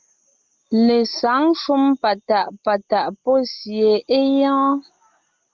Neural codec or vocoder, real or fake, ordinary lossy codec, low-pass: none; real; Opus, 32 kbps; 7.2 kHz